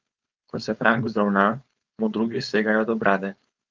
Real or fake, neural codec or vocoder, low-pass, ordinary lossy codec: fake; codec, 16 kHz, 4.8 kbps, FACodec; 7.2 kHz; Opus, 24 kbps